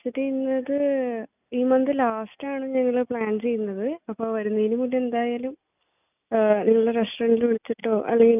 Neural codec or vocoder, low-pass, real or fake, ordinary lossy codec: none; 3.6 kHz; real; none